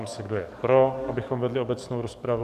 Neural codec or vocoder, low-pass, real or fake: codec, 44.1 kHz, 7.8 kbps, DAC; 14.4 kHz; fake